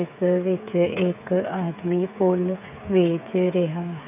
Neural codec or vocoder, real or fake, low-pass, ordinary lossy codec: codec, 16 kHz, 8 kbps, FreqCodec, smaller model; fake; 3.6 kHz; none